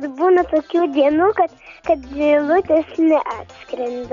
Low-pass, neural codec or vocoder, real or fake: 7.2 kHz; none; real